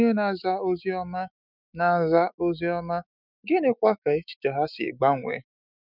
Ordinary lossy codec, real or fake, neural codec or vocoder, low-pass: none; fake; autoencoder, 48 kHz, 128 numbers a frame, DAC-VAE, trained on Japanese speech; 5.4 kHz